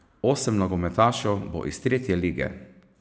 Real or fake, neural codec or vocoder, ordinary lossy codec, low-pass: real; none; none; none